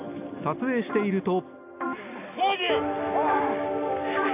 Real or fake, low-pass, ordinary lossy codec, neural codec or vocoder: real; 3.6 kHz; none; none